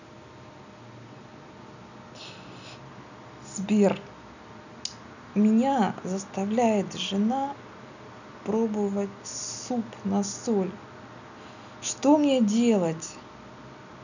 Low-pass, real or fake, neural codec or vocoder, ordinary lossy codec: 7.2 kHz; real; none; none